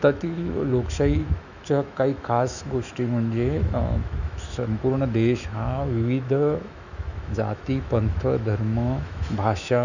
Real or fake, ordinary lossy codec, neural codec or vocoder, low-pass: fake; none; autoencoder, 48 kHz, 128 numbers a frame, DAC-VAE, trained on Japanese speech; 7.2 kHz